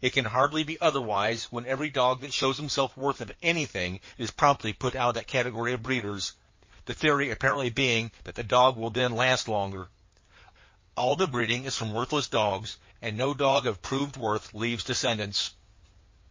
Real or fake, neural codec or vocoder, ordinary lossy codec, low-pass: fake; codec, 16 kHz in and 24 kHz out, 2.2 kbps, FireRedTTS-2 codec; MP3, 32 kbps; 7.2 kHz